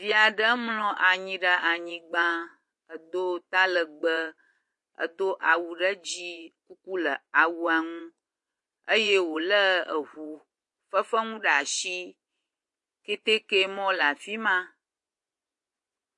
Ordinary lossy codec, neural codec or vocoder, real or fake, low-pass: MP3, 48 kbps; vocoder, 24 kHz, 100 mel bands, Vocos; fake; 10.8 kHz